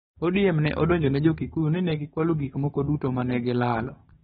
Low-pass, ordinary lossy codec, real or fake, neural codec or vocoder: 7.2 kHz; AAC, 16 kbps; fake; codec, 16 kHz, 6 kbps, DAC